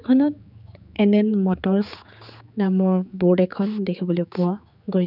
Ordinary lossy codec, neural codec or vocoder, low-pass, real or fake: none; codec, 16 kHz, 4 kbps, X-Codec, HuBERT features, trained on general audio; 5.4 kHz; fake